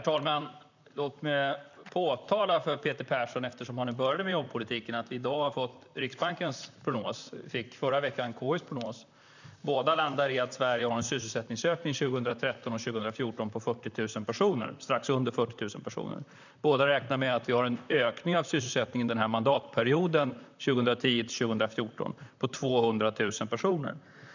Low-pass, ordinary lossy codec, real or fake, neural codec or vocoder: 7.2 kHz; none; fake; vocoder, 44.1 kHz, 128 mel bands, Pupu-Vocoder